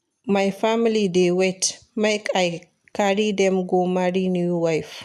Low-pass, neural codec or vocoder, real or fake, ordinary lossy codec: 14.4 kHz; none; real; none